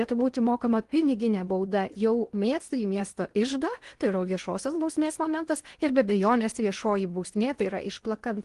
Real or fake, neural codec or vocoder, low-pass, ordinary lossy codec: fake; codec, 16 kHz in and 24 kHz out, 0.8 kbps, FocalCodec, streaming, 65536 codes; 10.8 kHz; Opus, 24 kbps